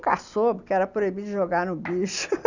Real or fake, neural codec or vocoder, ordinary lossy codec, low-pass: real; none; none; 7.2 kHz